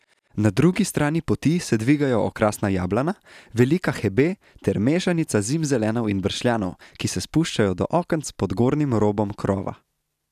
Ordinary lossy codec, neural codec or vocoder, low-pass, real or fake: none; none; 14.4 kHz; real